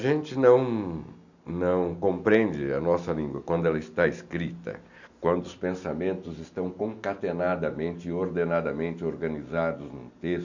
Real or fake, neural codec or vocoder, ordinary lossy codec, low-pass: real; none; none; 7.2 kHz